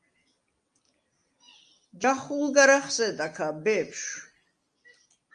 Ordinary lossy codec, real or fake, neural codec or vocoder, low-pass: Opus, 32 kbps; real; none; 9.9 kHz